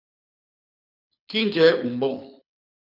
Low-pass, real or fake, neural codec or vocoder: 5.4 kHz; fake; codec, 24 kHz, 6 kbps, HILCodec